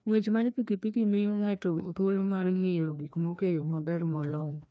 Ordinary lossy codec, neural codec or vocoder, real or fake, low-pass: none; codec, 16 kHz, 1 kbps, FreqCodec, larger model; fake; none